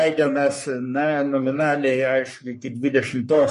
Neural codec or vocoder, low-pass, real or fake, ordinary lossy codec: codec, 44.1 kHz, 3.4 kbps, Pupu-Codec; 14.4 kHz; fake; MP3, 48 kbps